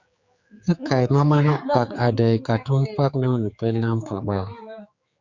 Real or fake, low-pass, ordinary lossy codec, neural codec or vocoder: fake; 7.2 kHz; Opus, 64 kbps; codec, 16 kHz, 4 kbps, X-Codec, HuBERT features, trained on general audio